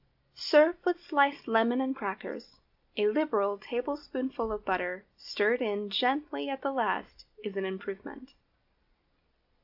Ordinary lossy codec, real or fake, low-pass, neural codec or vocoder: AAC, 48 kbps; real; 5.4 kHz; none